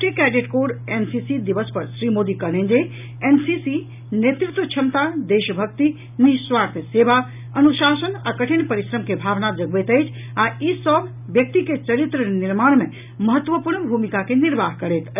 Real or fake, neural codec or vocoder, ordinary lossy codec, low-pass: real; none; none; 3.6 kHz